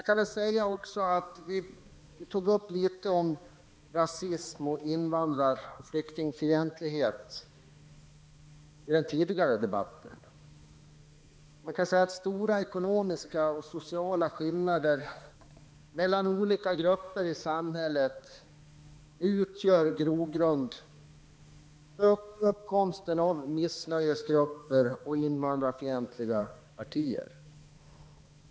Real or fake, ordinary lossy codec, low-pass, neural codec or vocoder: fake; none; none; codec, 16 kHz, 2 kbps, X-Codec, HuBERT features, trained on balanced general audio